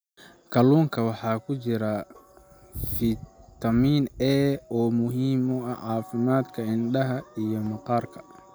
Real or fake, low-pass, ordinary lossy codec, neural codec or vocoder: real; none; none; none